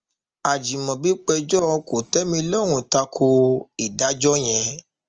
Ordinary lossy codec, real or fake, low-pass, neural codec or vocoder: Opus, 24 kbps; real; 7.2 kHz; none